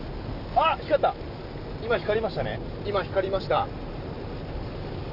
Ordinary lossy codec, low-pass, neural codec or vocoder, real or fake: none; 5.4 kHz; none; real